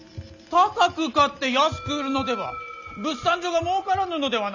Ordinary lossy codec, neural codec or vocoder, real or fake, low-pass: none; none; real; 7.2 kHz